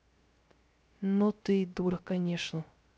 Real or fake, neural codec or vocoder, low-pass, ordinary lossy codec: fake; codec, 16 kHz, 0.3 kbps, FocalCodec; none; none